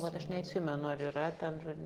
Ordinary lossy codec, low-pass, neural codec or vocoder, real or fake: Opus, 16 kbps; 19.8 kHz; none; real